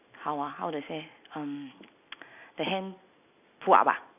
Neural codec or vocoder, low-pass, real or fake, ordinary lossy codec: none; 3.6 kHz; real; none